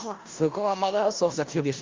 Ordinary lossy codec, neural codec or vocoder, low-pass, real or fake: Opus, 32 kbps; codec, 16 kHz in and 24 kHz out, 0.4 kbps, LongCat-Audio-Codec, four codebook decoder; 7.2 kHz; fake